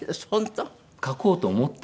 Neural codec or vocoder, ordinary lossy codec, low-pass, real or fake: none; none; none; real